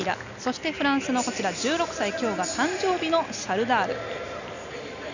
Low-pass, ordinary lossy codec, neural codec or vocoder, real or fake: 7.2 kHz; none; none; real